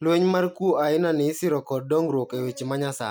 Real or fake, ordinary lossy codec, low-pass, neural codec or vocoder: real; none; none; none